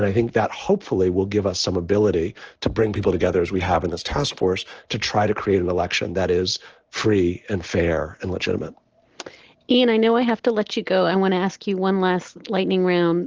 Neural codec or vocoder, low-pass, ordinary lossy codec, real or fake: none; 7.2 kHz; Opus, 16 kbps; real